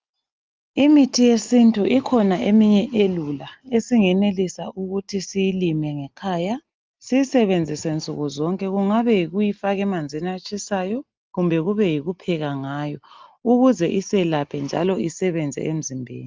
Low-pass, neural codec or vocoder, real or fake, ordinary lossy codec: 7.2 kHz; none; real; Opus, 32 kbps